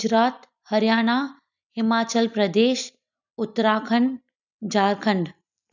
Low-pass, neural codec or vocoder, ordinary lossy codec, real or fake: 7.2 kHz; none; none; real